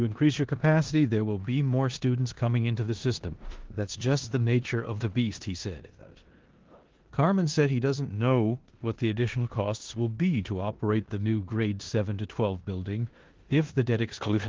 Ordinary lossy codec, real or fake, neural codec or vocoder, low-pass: Opus, 16 kbps; fake; codec, 16 kHz in and 24 kHz out, 0.9 kbps, LongCat-Audio-Codec, four codebook decoder; 7.2 kHz